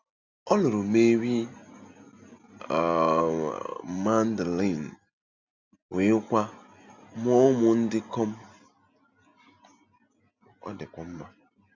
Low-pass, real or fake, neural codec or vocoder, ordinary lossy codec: 7.2 kHz; real; none; Opus, 64 kbps